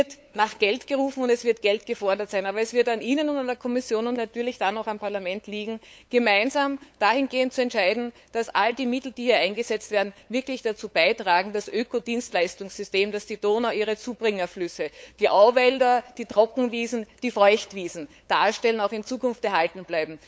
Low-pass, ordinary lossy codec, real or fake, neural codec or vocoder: none; none; fake; codec, 16 kHz, 8 kbps, FunCodec, trained on LibriTTS, 25 frames a second